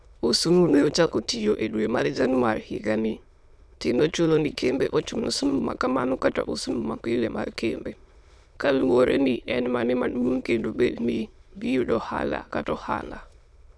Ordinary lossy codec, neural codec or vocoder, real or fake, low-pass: none; autoencoder, 22.05 kHz, a latent of 192 numbers a frame, VITS, trained on many speakers; fake; none